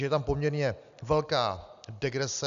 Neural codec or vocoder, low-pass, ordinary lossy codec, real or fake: none; 7.2 kHz; AAC, 96 kbps; real